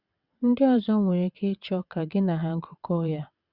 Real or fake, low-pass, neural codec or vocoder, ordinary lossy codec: fake; 5.4 kHz; vocoder, 22.05 kHz, 80 mel bands, WaveNeXt; Opus, 64 kbps